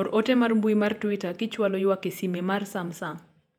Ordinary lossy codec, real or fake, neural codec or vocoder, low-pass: none; fake; vocoder, 48 kHz, 128 mel bands, Vocos; 19.8 kHz